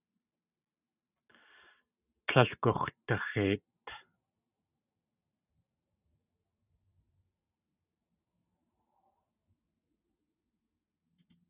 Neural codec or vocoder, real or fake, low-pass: vocoder, 44.1 kHz, 128 mel bands every 512 samples, BigVGAN v2; fake; 3.6 kHz